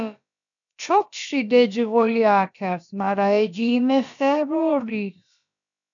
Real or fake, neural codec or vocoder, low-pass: fake; codec, 16 kHz, about 1 kbps, DyCAST, with the encoder's durations; 7.2 kHz